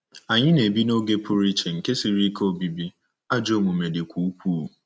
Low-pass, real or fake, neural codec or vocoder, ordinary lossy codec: none; real; none; none